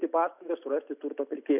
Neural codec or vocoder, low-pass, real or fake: none; 3.6 kHz; real